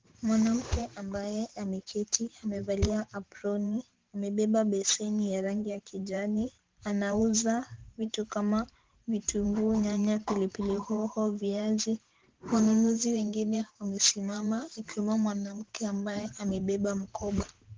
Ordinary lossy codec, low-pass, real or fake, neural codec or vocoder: Opus, 16 kbps; 7.2 kHz; fake; vocoder, 44.1 kHz, 128 mel bands every 512 samples, BigVGAN v2